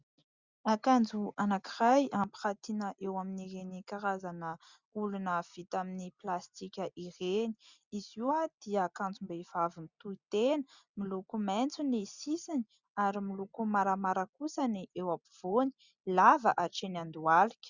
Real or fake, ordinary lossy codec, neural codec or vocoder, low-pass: real; Opus, 64 kbps; none; 7.2 kHz